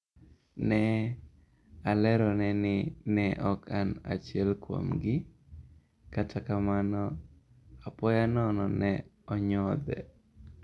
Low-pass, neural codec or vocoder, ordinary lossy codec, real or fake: none; none; none; real